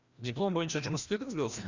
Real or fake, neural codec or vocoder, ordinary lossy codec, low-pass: fake; codec, 16 kHz, 1 kbps, FreqCodec, larger model; Opus, 64 kbps; 7.2 kHz